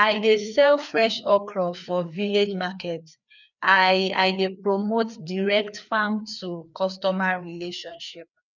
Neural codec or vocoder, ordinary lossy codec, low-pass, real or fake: codec, 16 kHz, 2 kbps, FreqCodec, larger model; none; 7.2 kHz; fake